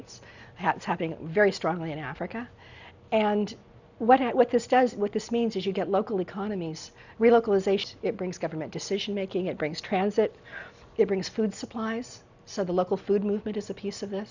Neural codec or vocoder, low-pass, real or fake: none; 7.2 kHz; real